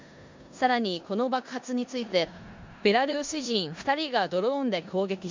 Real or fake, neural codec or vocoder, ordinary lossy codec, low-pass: fake; codec, 16 kHz in and 24 kHz out, 0.9 kbps, LongCat-Audio-Codec, four codebook decoder; MP3, 64 kbps; 7.2 kHz